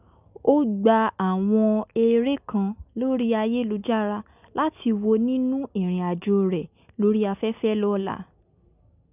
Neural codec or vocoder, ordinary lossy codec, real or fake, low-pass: none; none; real; 3.6 kHz